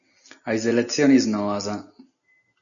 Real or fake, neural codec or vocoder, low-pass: real; none; 7.2 kHz